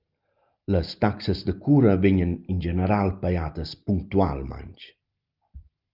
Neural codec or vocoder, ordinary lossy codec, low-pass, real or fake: none; Opus, 24 kbps; 5.4 kHz; real